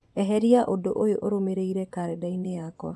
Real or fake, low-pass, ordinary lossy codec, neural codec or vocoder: fake; none; none; vocoder, 24 kHz, 100 mel bands, Vocos